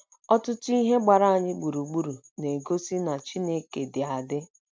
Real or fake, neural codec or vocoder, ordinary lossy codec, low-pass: real; none; none; none